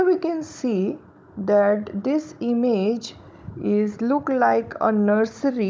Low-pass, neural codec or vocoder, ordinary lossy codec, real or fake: none; codec, 16 kHz, 16 kbps, FunCodec, trained on Chinese and English, 50 frames a second; none; fake